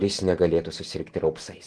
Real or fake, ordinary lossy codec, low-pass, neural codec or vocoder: real; Opus, 16 kbps; 10.8 kHz; none